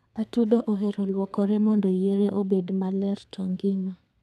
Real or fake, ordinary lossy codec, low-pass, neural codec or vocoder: fake; none; 14.4 kHz; codec, 32 kHz, 1.9 kbps, SNAC